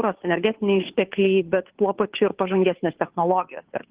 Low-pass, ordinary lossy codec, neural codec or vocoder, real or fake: 3.6 kHz; Opus, 16 kbps; codec, 16 kHz, 2 kbps, FunCodec, trained on Chinese and English, 25 frames a second; fake